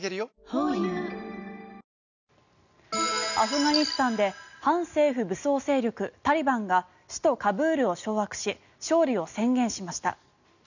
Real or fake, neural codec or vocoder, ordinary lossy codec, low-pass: real; none; none; 7.2 kHz